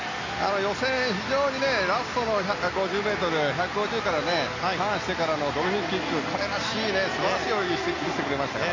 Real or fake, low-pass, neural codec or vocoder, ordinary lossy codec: real; 7.2 kHz; none; AAC, 32 kbps